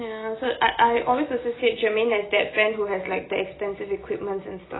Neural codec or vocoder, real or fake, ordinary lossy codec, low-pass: none; real; AAC, 16 kbps; 7.2 kHz